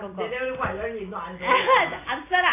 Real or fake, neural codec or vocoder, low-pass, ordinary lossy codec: real; none; 3.6 kHz; AAC, 24 kbps